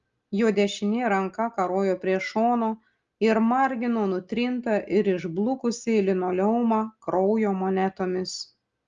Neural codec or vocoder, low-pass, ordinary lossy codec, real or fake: none; 7.2 kHz; Opus, 32 kbps; real